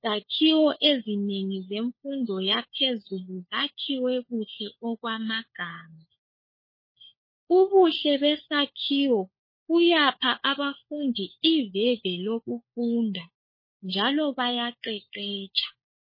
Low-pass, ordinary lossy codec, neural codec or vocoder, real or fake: 5.4 kHz; MP3, 24 kbps; codec, 16 kHz, 4 kbps, FunCodec, trained on LibriTTS, 50 frames a second; fake